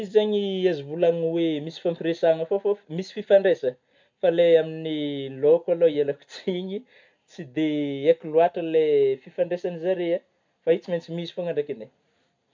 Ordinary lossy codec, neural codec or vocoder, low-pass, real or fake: MP3, 64 kbps; none; 7.2 kHz; real